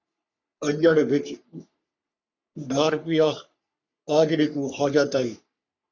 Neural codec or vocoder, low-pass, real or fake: codec, 44.1 kHz, 3.4 kbps, Pupu-Codec; 7.2 kHz; fake